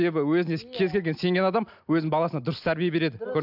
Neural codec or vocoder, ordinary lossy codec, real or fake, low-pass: none; AAC, 48 kbps; real; 5.4 kHz